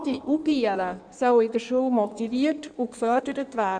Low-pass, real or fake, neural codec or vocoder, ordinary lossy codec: 9.9 kHz; fake; codec, 16 kHz in and 24 kHz out, 1.1 kbps, FireRedTTS-2 codec; none